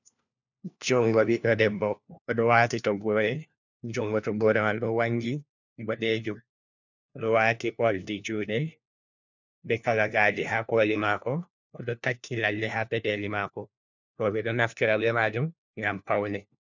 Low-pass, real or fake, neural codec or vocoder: 7.2 kHz; fake; codec, 16 kHz, 1 kbps, FunCodec, trained on LibriTTS, 50 frames a second